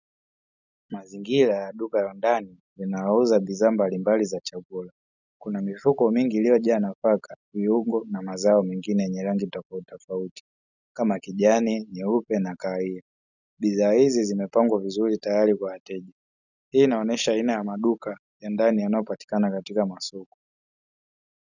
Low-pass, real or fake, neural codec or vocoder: 7.2 kHz; real; none